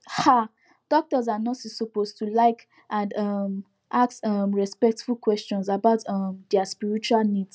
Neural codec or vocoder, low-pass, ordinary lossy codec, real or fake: none; none; none; real